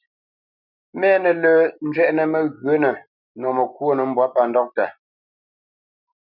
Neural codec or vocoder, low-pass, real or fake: none; 5.4 kHz; real